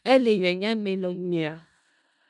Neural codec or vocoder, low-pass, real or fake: codec, 16 kHz in and 24 kHz out, 0.4 kbps, LongCat-Audio-Codec, four codebook decoder; 10.8 kHz; fake